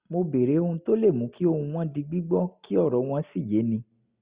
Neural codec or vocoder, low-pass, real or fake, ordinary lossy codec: none; 3.6 kHz; real; Opus, 64 kbps